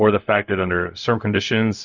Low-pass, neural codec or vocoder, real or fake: 7.2 kHz; codec, 16 kHz, 0.4 kbps, LongCat-Audio-Codec; fake